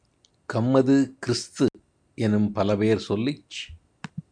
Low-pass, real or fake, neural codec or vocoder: 9.9 kHz; real; none